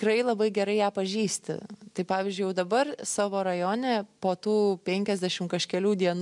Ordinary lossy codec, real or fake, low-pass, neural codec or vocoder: MP3, 96 kbps; real; 10.8 kHz; none